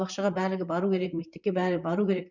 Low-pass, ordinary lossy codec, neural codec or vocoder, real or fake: 7.2 kHz; none; vocoder, 44.1 kHz, 128 mel bands, Pupu-Vocoder; fake